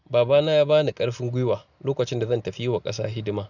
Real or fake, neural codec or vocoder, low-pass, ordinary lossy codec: real; none; 7.2 kHz; none